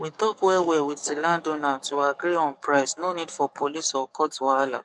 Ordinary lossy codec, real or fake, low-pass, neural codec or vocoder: none; fake; 10.8 kHz; codec, 44.1 kHz, 7.8 kbps, DAC